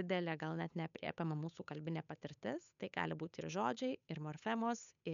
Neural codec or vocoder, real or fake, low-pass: codec, 16 kHz, 8 kbps, FunCodec, trained on Chinese and English, 25 frames a second; fake; 7.2 kHz